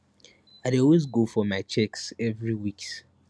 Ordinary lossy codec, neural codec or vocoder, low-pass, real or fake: none; none; none; real